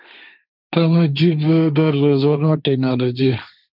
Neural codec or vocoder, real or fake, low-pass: codec, 16 kHz, 1.1 kbps, Voila-Tokenizer; fake; 5.4 kHz